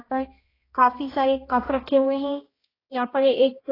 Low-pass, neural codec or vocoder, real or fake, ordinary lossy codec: 5.4 kHz; codec, 16 kHz, 1 kbps, X-Codec, HuBERT features, trained on balanced general audio; fake; AAC, 24 kbps